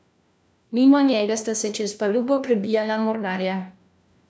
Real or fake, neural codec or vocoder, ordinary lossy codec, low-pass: fake; codec, 16 kHz, 1 kbps, FunCodec, trained on LibriTTS, 50 frames a second; none; none